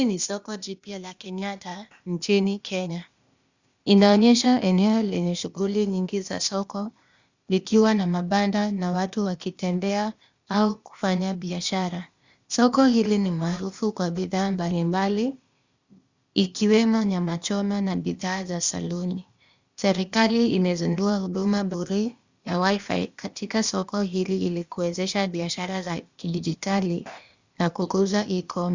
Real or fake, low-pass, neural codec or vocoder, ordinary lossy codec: fake; 7.2 kHz; codec, 16 kHz, 0.8 kbps, ZipCodec; Opus, 64 kbps